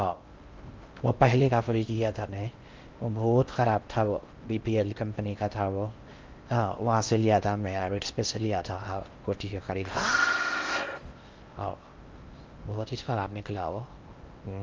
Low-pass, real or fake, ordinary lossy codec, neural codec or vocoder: 7.2 kHz; fake; Opus, 32 kbps; codec, 16 kHz in and 24 kHz out, 0.6 kbps, FocalCodec, streaming, 4096 codes